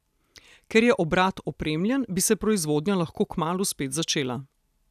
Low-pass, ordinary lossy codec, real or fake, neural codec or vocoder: 14.4 kHz; none; real; none